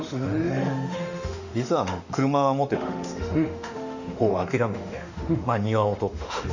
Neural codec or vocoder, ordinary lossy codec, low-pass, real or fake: autoencoder, 48 kHz, 32 numbers a frame, DAC-VAE, trained on Japanese speech; none; 7.2 kHz; fake